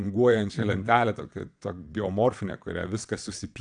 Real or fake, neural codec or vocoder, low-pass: fake; vocoder, 22.05 kHz, 80 mel bands, WaveNeXt; 9.9 kHz